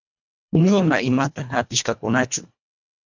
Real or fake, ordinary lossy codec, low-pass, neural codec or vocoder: fake; MP3, 64 kbps; 7.2 kHz; codec, 24 kHz, 1.5 kbps, HILCodec